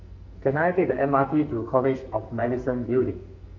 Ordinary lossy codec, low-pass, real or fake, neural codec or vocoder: MP3, 64 kbps; 7.2 kHz; fake; codec, 44.1 kHz, 2.6 kbps, SNAC